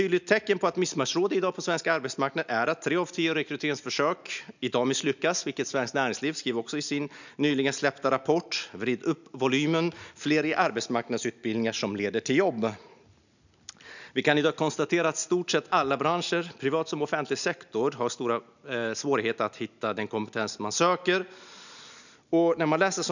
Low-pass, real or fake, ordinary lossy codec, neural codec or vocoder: 7.2 kHz; real; none; none